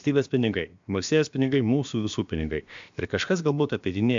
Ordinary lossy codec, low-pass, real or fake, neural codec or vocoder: MP3, 64 kbps; 7.2 kHz; fake; codec, 16 kHz, about 1 kbps, DyCAST, with the encoder's durations